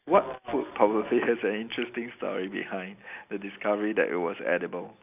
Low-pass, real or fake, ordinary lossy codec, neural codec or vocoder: 3.6 kHz; real; none; none